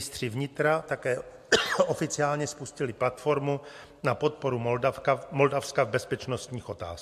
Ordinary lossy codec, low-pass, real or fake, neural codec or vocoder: MP3, 64 kbps; 14.4 kHz; real; none